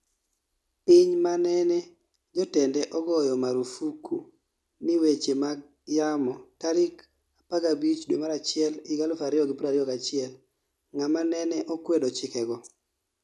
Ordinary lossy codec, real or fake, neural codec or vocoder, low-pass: none; real; none; none